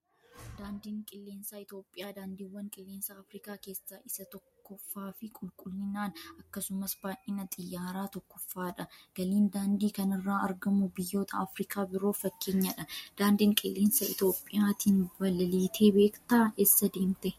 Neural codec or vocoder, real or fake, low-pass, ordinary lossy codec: none; real; 19.8 kHz; MP3, 64 kbps